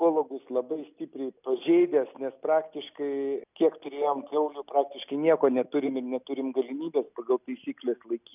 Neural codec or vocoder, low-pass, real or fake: none; 3.6 kHz; real